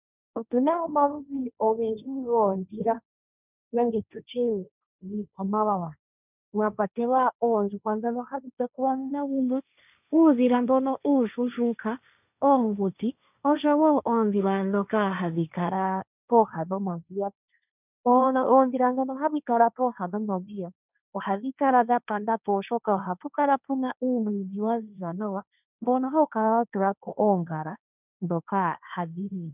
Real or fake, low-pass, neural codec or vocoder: fake; 3.6 kHz; codec, 16 kHz, 1.1 kbps, Voila-Tokenizer